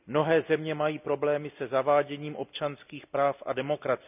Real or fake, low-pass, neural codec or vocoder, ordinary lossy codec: real; 3.6 kHz; none; none